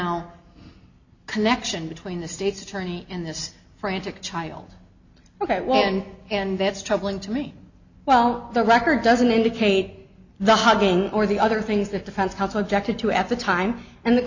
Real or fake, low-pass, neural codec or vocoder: real; 7.2 kHz; none